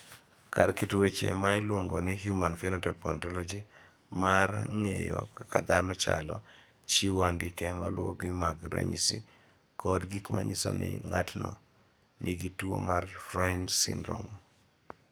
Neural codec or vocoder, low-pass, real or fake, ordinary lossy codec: codec, 44.1 kHz, 2.6 kbps, SNAC; none; fake; none